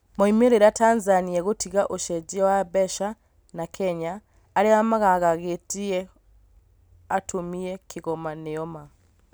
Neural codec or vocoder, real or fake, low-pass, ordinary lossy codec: none; real; none; none